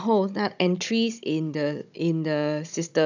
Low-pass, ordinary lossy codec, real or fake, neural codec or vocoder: 7.2 kHz; none; fake; codec, 16 kHz, 16 kbps, FunCodec, trained on Chinese and English, 50 frames a second